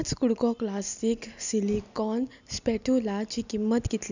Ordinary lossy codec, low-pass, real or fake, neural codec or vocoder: none; 7.2 kHz; real; none